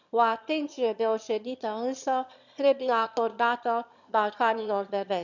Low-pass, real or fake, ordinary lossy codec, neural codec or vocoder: 7.2 kHz; fake; none; autoencoder, 22.05 kHz, a latent of 192 numbers a frame, VITS, trained on one speaker